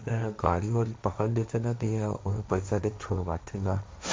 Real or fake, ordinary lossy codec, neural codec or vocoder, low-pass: fake; none; codec, 16 kHz, 1.1 kbps, Voila-Tokenizer; none